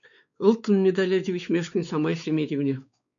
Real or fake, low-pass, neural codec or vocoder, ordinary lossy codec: fake; 7.2 kHz; codec, 16 kHz, 4 kbps, X-Codec, WavLM features, trained on Multilingual LibriSpeech; MP3, 96 kbps